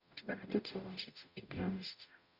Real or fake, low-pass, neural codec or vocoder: fake; 5.4 kHz; codec, 44.1 kHz, 0.9 kbps, DAC